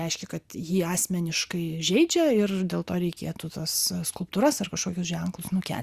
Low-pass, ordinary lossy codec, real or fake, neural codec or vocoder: 14.4 kHz; Opus, 64 kbps; real; none